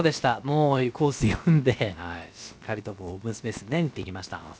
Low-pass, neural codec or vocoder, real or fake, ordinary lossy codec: none; codec, 16 kHz, about 1 kbps, DyCAST, with the encoder's durations; fake; none